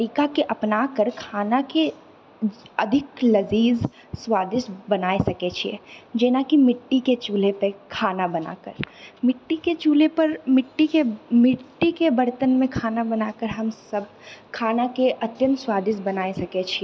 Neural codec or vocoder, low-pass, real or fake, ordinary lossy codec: none; none; real; none